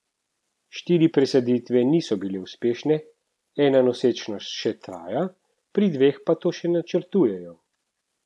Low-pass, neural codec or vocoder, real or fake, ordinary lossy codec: none; none; real; none